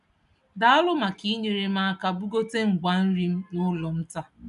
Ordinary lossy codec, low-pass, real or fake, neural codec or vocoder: none; 10.8 kHz; real; none